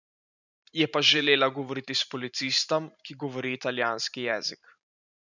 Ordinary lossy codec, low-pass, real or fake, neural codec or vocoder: none; 7.2 kHz; fake; vocoder, 44.1 kHz, 128 mel bands every 512 samples, BigVGAN v2